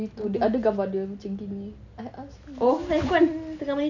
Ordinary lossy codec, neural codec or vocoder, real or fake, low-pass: none; none; real; 7.2 kHz